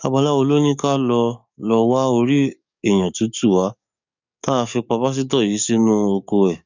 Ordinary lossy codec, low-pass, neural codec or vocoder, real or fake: none; 7.2 kHz; codec, 44.1 kHz, 7.8 kbps, DAC; fake